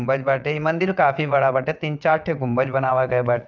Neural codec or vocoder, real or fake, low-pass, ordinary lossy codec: vocoder, 22.05 kHz, 80 mel bands, WaveNeXt; fake; 7.2 kHz; none